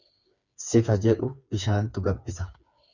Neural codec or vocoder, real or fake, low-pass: codec, 16 kHz, 4 kbps, FreqCodec, smaller model; fake; 7.2 kHz